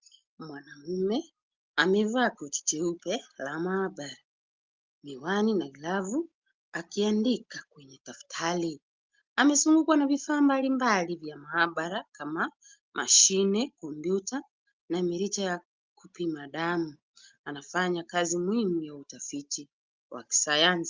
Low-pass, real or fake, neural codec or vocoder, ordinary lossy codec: 7.2 kHz; real; none; Opus, 32 kbps